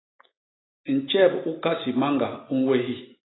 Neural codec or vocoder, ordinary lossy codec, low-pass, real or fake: none; AAC, 16 kbps; 7.2 kHz; real